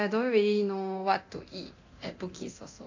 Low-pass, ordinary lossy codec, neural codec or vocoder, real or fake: 7.2 kHz; AAC, 48 kbps; codec, 24 kHz, 0.9 kbps, DualCodec; fake